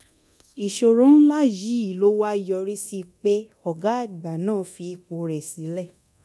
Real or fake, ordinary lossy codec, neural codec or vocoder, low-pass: fake; none; codec, 24 kHz, 0.9 kbps, DualCodec; none